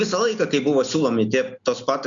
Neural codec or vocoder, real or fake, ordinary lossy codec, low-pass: none; real; MP3, 96 kbps; 7.2 kHz